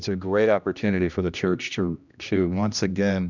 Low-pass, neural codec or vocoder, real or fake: 7.2 kHz; codec, 16 kHz, 1 kbps, X-Codec, HuBERT features, trained on general audio; fake